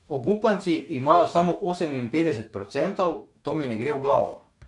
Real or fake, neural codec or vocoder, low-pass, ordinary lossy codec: fake; codec, 44.1 kHz, 2.6 kbps, DAC; 10.8 kHz; none